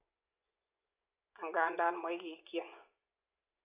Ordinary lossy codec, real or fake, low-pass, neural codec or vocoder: AAC, 32 kbps; fake; 3.6 kHz; vocoder, 24 kHz, 100 mel bands, Vocos